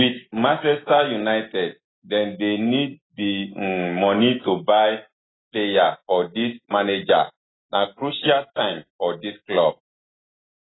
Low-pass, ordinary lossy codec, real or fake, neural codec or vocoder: 7.2 kHz; AAC, 16 kbps; real; none